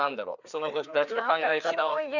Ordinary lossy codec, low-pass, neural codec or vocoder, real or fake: none; 7.2 kHz; codec, 16 kHz, 2 kbps, FreqCodec, larger model; fake